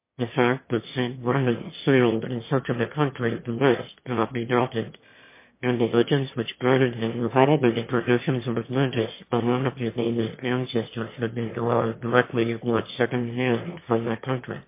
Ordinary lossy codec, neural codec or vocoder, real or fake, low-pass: MP3, 24 kbps; autoencoder, 22.05 kHz, a latent of 192 numbers a frame, VITS, trained on one speaker; fake; 3.6 kHz